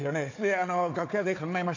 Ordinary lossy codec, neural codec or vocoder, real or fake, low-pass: AAC, 48 kbps; codec, 16 kHz, 4 kbps, FunCodec, trained on Chinese and English, 50 frames a second; fake; 7.2 kHz